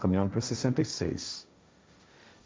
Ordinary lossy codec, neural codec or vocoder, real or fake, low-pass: none; codec, 16 kHz, 1.1 kbps, Voila-Tokenizer; fake; none